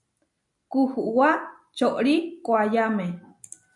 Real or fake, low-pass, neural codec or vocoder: real; 10.8 kHz; none